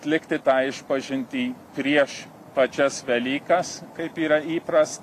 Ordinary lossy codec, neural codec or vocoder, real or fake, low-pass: AAC, 64 kbps; none; real; 14.4 kHz